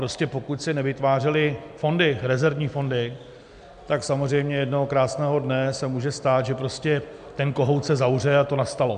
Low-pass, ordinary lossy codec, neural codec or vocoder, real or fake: 9.9 kHz; MP3, 96 kbps; none; real